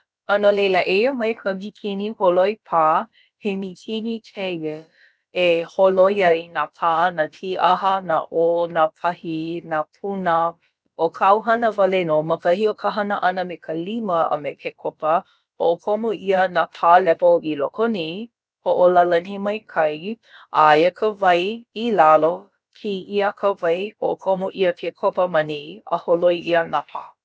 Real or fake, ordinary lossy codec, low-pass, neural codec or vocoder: fake; none; none; codec, 16 kHz, about 1 kbps, DyCAST, with the encoder's durations